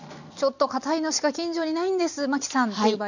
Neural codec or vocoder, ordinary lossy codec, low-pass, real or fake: none; none; 7.2 kHz; real